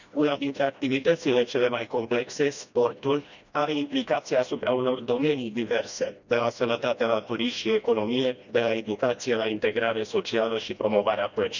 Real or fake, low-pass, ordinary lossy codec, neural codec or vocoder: fake; 7.2 kHz; none; codec, 16 kHz, 1 kbps, FreqCodec, smaller model